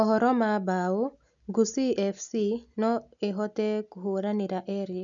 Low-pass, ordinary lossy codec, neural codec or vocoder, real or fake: 7.2 kHz; none; none; real